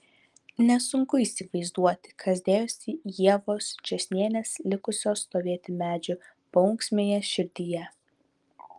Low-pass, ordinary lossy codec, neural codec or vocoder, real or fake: 10.8 kHz; Opus, 32 kbps; none; real